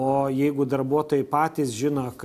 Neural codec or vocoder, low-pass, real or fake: none; 14.4 kHz; real